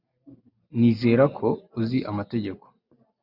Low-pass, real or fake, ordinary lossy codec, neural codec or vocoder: 5.4 kHz; real; Opus, 64 kbps; none